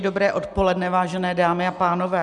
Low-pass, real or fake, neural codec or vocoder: 10.8 kHz; real; none